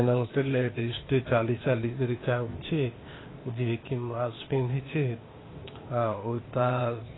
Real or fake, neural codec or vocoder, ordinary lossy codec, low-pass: fake; codec, 16 kHz, 0.8 kbps, ZipCodec; AAC, 16 kbps; 7.2 kHz